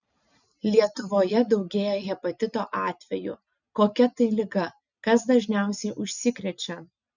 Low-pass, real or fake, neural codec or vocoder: 7.2 kHz; real; none